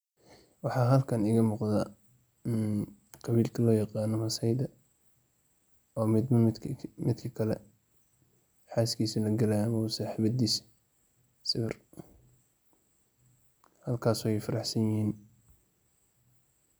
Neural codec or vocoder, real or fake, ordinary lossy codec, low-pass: none; real; none; none